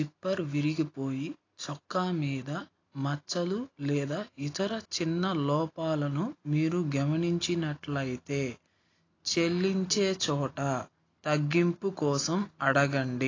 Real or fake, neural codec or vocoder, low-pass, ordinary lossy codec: real; none; 7.2 kHz; AAC, 32 kbps